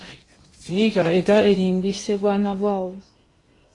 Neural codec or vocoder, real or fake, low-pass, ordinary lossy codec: codec, 16 kHz in and 24 kHz out, 0.8 kbps, FocalCodec, streaming, 65536 codes; fake; 10.8 kHz; AAC, 32 kbps